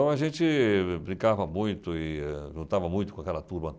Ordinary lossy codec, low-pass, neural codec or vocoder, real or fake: none; none; none; real